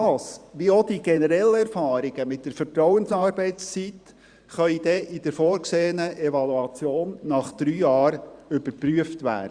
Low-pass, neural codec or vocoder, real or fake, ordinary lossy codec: 9.9 kHz; vocoder, 44.1 kHz, 128 mel bands every 512 samples, BigVGAN v2; fake; Opus, 64 kbps